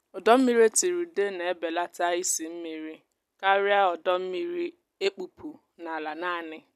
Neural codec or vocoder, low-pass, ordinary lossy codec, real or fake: none; 14.4 kHz; none; real